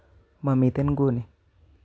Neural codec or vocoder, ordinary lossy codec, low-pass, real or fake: none; none; none; real